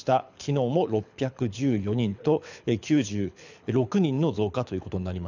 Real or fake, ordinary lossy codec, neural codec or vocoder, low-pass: fake; none; codec, 24 kHz, 6 kbps, HILCodec; 7.2 kHz